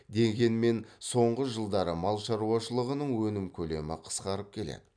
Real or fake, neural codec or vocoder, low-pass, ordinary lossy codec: real; none; 9.9 kHz; none